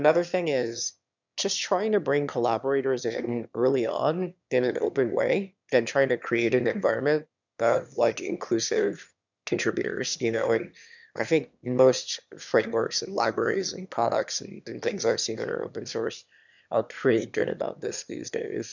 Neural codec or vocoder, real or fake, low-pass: autoencoder, 22.05 kHz, a latent of 192 numbers a frame, VITS, trained on one speaker; fake; 7.2 kHz